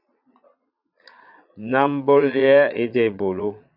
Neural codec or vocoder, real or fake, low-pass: vocoder, 22.05 kHz, 80 mel bands, Vocos; fake; 5.4 kHz